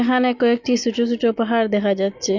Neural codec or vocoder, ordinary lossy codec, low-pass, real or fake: none; none; 7.2 kHz; real